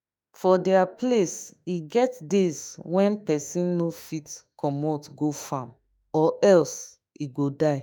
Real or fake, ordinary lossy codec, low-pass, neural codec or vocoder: fake; none; none; autoencoder, 48 kHz, 32 numbers a frame, DAC-VAE, trained on Japanese speech